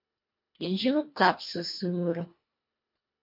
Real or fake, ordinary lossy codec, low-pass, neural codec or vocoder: fake; MP3, 32 kbps; 5.4 kHz; codec, 24 kHz, 1.5 kbps, HILCodec